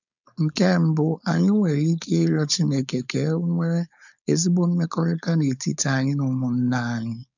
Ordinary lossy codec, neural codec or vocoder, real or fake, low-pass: none; codec, 16 kHz, 4.8 kbps, FACodec; fake; 7.2 kHz